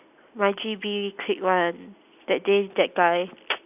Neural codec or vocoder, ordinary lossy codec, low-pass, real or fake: none; AAC, 32 kbps; 3.6 kHz; real